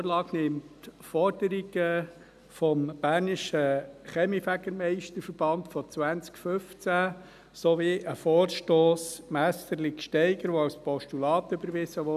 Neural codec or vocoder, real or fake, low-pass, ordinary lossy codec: none; real; 14.4 kHz; none